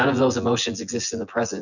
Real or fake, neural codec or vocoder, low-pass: fake; vocoder, 24 kHz, 100 mel bands, Vocos; 7.2 kHz